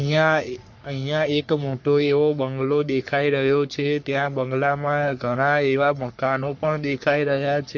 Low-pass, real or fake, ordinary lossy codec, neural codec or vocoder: 7.2 kHz; fake; MP3, 48 kbps; codec, 44.1 kHz, 3.4 kbps, Pupu-Codec